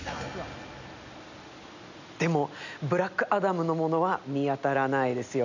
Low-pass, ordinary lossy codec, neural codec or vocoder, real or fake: 7.2 kHz; none; none; real